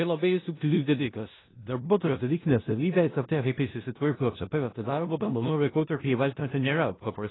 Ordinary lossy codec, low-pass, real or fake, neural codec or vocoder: AAC, 16 kbps; 7.2 kHz; fake; codec, 16 kHz in and 24 kHz out, 0.4 kbps, LongCat-Audio-Codec, four codebook decoder